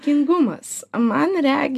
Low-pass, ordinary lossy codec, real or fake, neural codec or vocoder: 14.4 kHz; AAC, 96 kbps; real; none